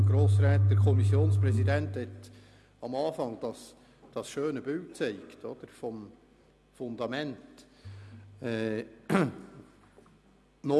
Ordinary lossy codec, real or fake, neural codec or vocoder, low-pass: none; real; none; none